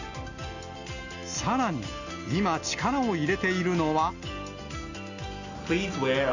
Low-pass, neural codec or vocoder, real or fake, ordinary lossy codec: 7.2 kHz; none; real; none